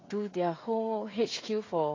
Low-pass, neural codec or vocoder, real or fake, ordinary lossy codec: 7.2 kHz; codec, 16 kHz, 16 kbps, FreqCodec, smaller model; fake; AAC, 32 kbps